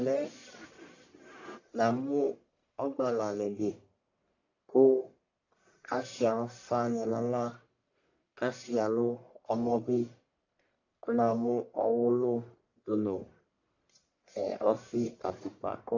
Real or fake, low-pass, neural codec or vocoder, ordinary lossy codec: fake; 7.2 kHz; codec, 44.1 kHz, 1.7 kbps, Pupu-Codec; AAC, 48 kbps